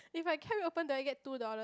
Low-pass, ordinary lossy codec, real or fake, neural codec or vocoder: none; none; real; none